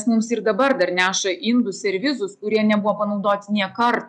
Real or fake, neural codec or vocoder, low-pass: real; none; 10.8 kHz